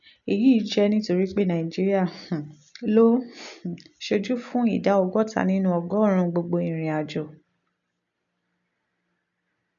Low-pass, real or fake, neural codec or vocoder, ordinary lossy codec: 7.2 kHz; real; none; none